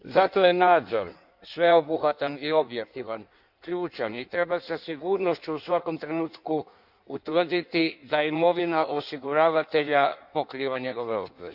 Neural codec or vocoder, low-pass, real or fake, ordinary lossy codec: codec, 16 kHz in and 24 kHz out, 1.1 kbps, FireRedTTS-2 codec; 5.4 kHz; fake; none